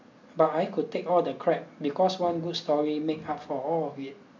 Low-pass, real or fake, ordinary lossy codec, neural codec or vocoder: 7.2 kHz; real; MP3, 48 kbps; none